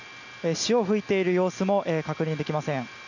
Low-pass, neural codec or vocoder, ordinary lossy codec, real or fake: 7.2 kHz; none; none; real